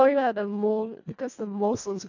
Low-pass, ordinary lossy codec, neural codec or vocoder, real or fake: 7.2 kHz; AAC, 48 kbps; codec, 24 kHz, 1.5 kbps, HILCodec; fake